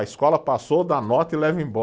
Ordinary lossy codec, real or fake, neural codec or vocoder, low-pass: none; real; none; none